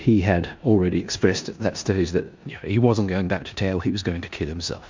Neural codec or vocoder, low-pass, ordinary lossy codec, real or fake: codec, 16 kHz in and 24 kHz out, 0.9 kbps, LongCat-Audio-Codec, four codebook decoder; 7.2 kHz; MP3, 64 kbps; fake